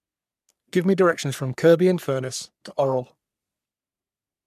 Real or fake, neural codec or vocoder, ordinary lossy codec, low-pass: fake; codec, 44.1 kHz, 3.4 kbps, Pupu-Codec; none; 14.4 kHz